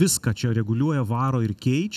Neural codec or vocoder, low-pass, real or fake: none; 14.4 kHz; real